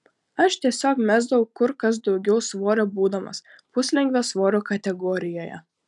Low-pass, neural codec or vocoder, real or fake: 10.8 kHz; none; real